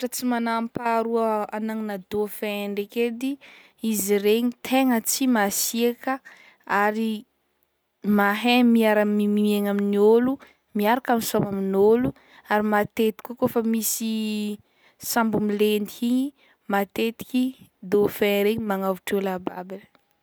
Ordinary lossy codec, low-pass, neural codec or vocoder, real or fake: none; none; none; real